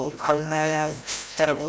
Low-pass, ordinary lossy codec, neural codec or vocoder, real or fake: none; none; codec, 16 kHz, 0.5 kbps, FreqCodec, larger model; fake